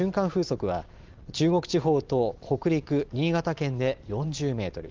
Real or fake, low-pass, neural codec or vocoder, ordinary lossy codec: fake; 7.2 kHz; vocoder, 44.1 kHz, 128 mel bands every 512 samples, BigVGAN v2; Opus, 24 kbps